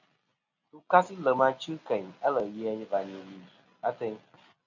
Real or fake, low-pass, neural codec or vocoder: real; 7.2 kHz; none